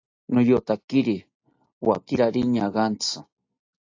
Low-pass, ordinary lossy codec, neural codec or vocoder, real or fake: 7.2 kHz; AAC, 48 kbps; none; real